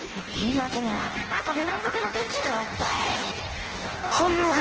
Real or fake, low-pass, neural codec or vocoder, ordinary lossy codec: fake; 7.2 kHz; codec, 16 kHz in and 24 kHz out, 0.6 kbps, FireRedTTS-2 codec; Opus, 16 kbps